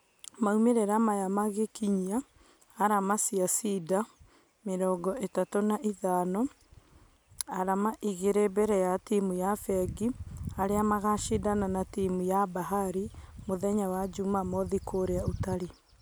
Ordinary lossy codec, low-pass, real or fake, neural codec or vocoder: none; none; real; none